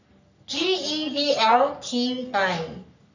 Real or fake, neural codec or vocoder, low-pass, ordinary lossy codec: fake; codec, 44.1 kHz, 3.4 kbps, Pupu-Codec; 7.2 kHz; none